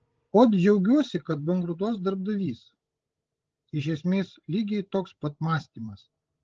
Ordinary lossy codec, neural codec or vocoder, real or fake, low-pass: Opus, 16 kbps; codec, 16 kHz, 16 kbps, FreqCodec, larger model; fake; 7.2 kHz